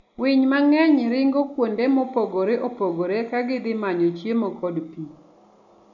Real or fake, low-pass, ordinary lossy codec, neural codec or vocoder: real; 7.2 kHz; none; none